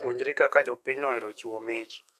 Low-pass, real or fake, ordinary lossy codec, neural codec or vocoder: 14.4 kHz; fake; none; codec, 32 kHz, 1.9 kbps, SNAC